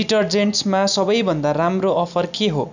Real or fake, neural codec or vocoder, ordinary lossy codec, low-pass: real; none; none; 7.2 kHz